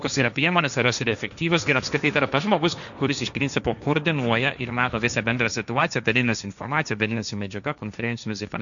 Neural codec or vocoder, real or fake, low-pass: codec, 16 kHz, 1.1 kbps, Voila-Tokenizer; fake; 7.2 kHz